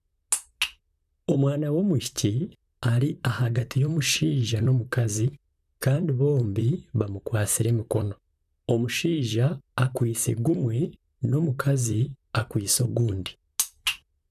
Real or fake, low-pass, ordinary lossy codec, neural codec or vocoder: fake; 14.4 kHz; none; vocoder, 44.1 kHz, 128 mel bands, Pupu-Vocoder